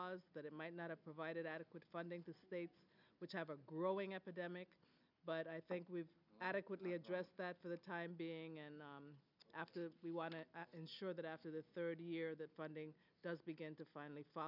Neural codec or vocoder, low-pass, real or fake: none; 5.4 kHz; real